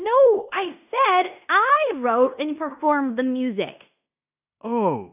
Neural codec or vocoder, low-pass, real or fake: codec, 16 kHz in and 24 kHz out, 0.9 kbps, LongCat-Audio-Codec, fine tuned four codebook decoder; 3.6 kHz; fake